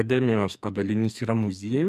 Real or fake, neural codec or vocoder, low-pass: fake; codec, 44.1 kHz, 2.6 kbps, SNAC; 14.4 kHz